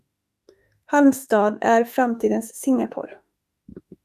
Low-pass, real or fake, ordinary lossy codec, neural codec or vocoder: 14.4 kHz; fake; Opus, 64 kbps; autoencoder, 48 kHz, 32 numbers a frame, DAC-VAE, trained on Japanese speech